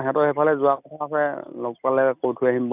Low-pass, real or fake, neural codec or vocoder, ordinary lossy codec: 3.6 kHz; real; none; none